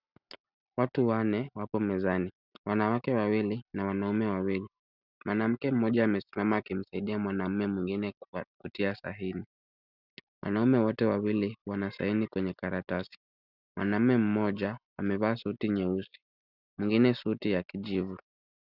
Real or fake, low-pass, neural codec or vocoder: real; 5.4 kHz; none